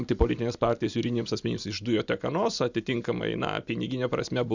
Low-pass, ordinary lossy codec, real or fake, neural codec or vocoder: 7.2 kHz; Opus, 64 kbps; real; none